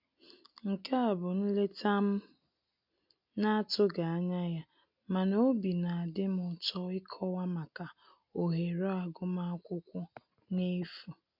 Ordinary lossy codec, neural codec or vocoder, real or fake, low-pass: MP3, 48 kbps; none; real; 5.4 kHz